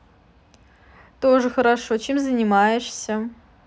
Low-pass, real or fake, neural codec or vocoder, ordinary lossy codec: none; real; none; none